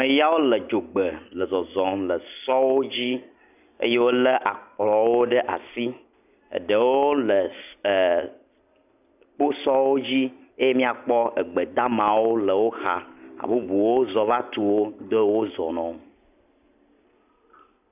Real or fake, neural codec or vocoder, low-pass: real; none; 3.6 kHz